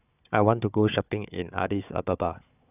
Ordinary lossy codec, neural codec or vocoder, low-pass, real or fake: none; codec, 16 kHz, 4 kbps, FunCodec, trained on Chinese and English, 50 frames a second; 3.6 kHz; fake